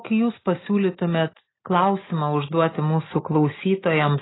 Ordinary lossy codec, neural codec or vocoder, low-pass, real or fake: AAC, 16 kbps; none; 7.2 kHz; real